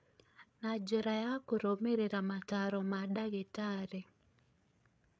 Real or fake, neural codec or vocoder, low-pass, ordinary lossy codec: fake; codec, 16 kHz, 8 kbps, FreqCodec, larger model; none; none